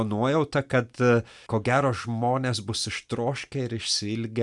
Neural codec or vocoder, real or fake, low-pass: none; real; 10.8 kHz